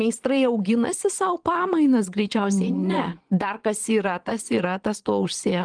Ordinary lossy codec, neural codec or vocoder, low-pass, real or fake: Opus, 24 kbps; vocoder, 22.05 kHz, 80 mel bands, Vocos; 9.9 kHz; fake